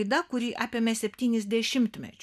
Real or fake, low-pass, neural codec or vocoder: real; 14.4 kHz; none